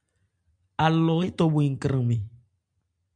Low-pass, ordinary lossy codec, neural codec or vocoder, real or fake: 9.9 kHz; MP3, 96 kbps; none; real